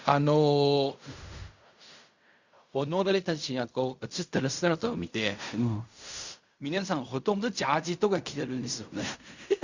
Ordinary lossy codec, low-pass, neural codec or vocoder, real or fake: Opus, 64 kbps; 7.2 kHz; codec, 16 kHz in and 24 kHz out, 0.4 kbps, LongCat-Audio-Codec, fine tuned four codebook decoder; fake